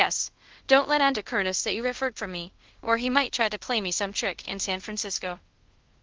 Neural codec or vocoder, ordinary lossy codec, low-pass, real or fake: codec, 24 kHz, 0.5 kbps, DualCodec; Opus, 16 kbps; 7.2 kHz; fake